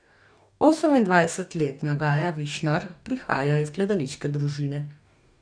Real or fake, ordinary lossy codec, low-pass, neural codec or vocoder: fake; none; 9.9 kHz; codec, 44.1 kHz, 2.6 kbps, DAC